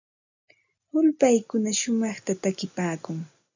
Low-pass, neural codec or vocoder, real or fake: 7.2 kHz; none; real